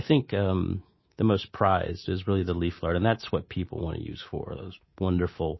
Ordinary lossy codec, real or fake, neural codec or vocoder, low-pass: MP3, 24 kbps; fake; codec, 24 kHz, 3.1 kbps, DualCodec; 7.2 kHz